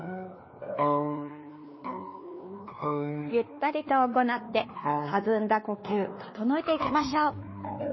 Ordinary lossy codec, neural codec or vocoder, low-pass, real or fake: MP3, 24 kbps; codec, 16 kHz, 2 kbps, X-Codec, WavLM features, trained on Multilingual LibriSpeech; 7.2 kHz; fake